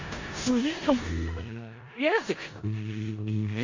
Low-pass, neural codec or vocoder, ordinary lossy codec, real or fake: 7.2 kHz; codec, 16 kHz in and 24 kHz out, 0.4 kbps, LongCat-Audio-Codec, four codebook decoder; MP3, 48 kbps; fake